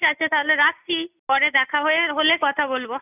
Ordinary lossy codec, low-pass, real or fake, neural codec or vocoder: none; 3.6 kHz; real; none